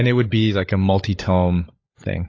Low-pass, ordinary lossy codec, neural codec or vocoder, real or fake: 7.2 kHz; AAC, 32 kbps; codec, 16 kHz, 16 kbps, FunCodec, trained on LibriTTS, 50 frames a second; fake